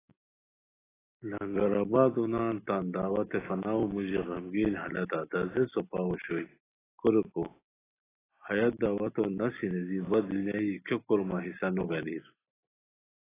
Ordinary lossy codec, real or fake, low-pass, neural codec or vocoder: AAC, 16 kbps; real; 3.6 kHz; none